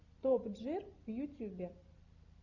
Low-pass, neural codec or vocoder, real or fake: 7.2 kHz; none; real